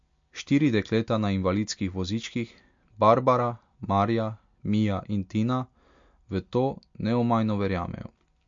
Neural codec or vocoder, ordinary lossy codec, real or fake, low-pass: none; MP3, 48 kbps; real; 7.2 kHz